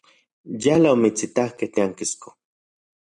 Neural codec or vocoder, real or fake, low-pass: none; real; 10.8 kHz